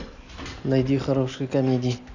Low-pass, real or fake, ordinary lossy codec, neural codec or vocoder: 7.2 kHz; real; none; none